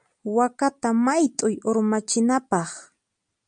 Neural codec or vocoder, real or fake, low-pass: none; real; 9.9 kHz